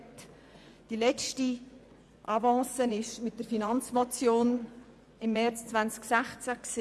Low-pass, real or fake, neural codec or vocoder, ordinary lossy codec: none; fake; vocoder, 24 kHz, 100 mel bands, Vocos; none